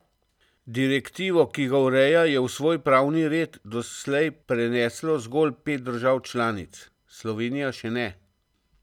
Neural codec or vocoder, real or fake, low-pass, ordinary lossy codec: none; real; 19.8 kHz; none